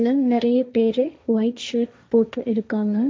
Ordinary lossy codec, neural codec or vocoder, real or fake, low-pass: none; codec, 16 kHz, 1.1 kbps, Voila-Tokenizer; fake; none